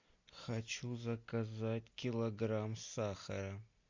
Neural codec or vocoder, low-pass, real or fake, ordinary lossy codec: none; 7.2 kHz; real; MP3, 64 kbps